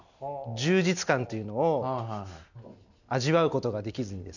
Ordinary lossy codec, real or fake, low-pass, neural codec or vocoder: none; real; 7.2 kHz; none